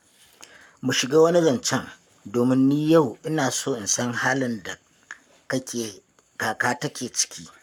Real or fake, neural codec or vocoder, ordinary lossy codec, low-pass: fake; codec, 44.1 kHz, 7.8 kbps, Pupu-Codec; none; 19.8 kHz